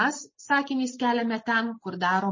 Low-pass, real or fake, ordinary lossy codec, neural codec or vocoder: 7.2 kHz; real; MP3, 32 kbps; none